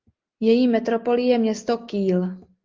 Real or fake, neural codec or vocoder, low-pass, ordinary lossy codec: real; none; 7.2 kHz; Opus, 32 kbps